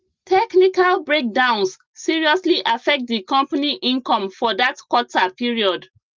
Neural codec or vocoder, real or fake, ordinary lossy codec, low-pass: none; real; Opus, 32 kbps; 7.2 kHz